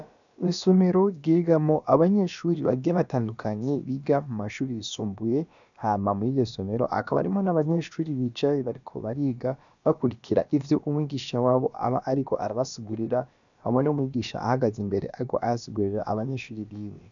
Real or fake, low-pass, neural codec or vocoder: fake; 7.2 kHz; codec, 16 kHz, about 1 kbps, DyCAST, with the encoder's durations